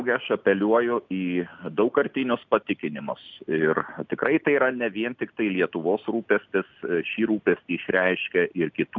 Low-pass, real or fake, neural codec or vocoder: 7.2 kHz; real; none